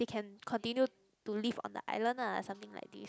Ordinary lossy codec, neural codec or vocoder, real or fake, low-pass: none; none; real; none